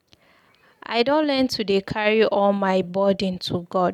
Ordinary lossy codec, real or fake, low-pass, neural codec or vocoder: none; real; 19.8 kHz; none